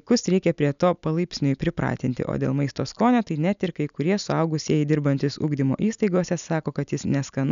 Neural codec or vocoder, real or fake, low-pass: none; real; 7.2 kHz